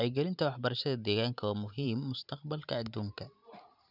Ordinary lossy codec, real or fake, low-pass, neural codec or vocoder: none; fake; 5.4 kHz; vocoder, 44.1 kHz, 128 mel bands every 512 samples, BigVGAN v2